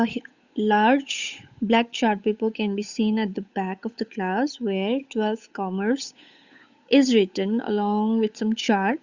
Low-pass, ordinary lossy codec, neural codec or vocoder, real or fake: 7.2 kHz; Opus, 64 kbps; codec, 16 kHz, 8 kbps, FunCodec, trained on Chinese and English, 25 frames a second; fake